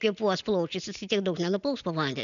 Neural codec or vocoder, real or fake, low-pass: none; real; 7.2 kHz